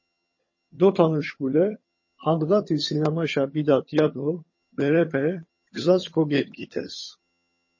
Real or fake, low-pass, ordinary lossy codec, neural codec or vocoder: fake; 7.2 kHz; MP3, 32 kbps; vocoder, 22.05 kHz, 80 mel bands, HiFi-GAN